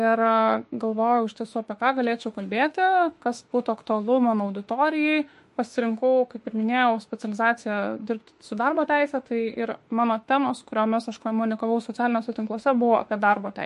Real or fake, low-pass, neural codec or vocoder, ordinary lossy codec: fake; 14.4 kHz; autoencoder, 48 kHz, 32 numbers a frame, DAC-VAE, trained on Japanese speech; MP3, 48 kbps